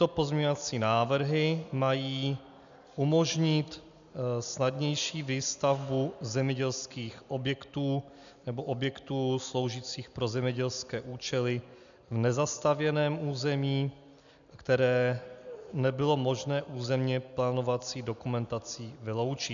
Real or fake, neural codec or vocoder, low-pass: real; none; 7.2 kHz